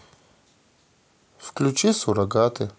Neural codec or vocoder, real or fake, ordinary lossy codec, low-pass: none; real; none; none